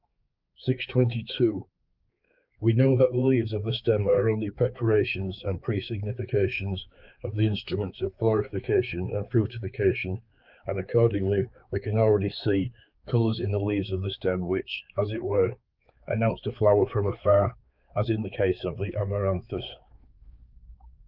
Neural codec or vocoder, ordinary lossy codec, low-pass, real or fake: codec, 16 kHz, 4 kbps, X-Codec, HuBERT features, trained on balanced general audio; Opus, 24 kbps; 5.4 kHz; fake